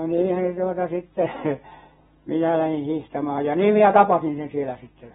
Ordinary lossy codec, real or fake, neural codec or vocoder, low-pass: AAC, 16 kbps; real; none; 19.8 kHz